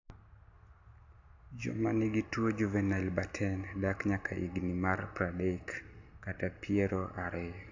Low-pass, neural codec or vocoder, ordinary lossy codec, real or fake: 7.2 kHz; none; AAC, 48 kbps; real